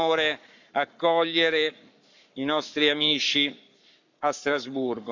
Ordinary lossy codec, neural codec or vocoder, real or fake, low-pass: none; codec, 44.1 kHz, 7.8 kbps, Pupu-Codec; fake; 7.2 kHz